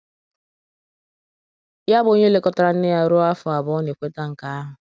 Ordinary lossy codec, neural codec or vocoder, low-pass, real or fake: none; none; none; real